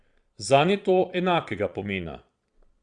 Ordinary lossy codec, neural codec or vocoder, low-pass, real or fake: Opus, 64 kbps; vocoder, 22.05 kHz, 80 mel bands, Vocos; 9.9 kHz; fake